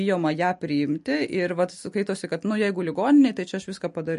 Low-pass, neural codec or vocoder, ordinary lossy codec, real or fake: 14.4 kHz; none; MP3, 48 kbps; real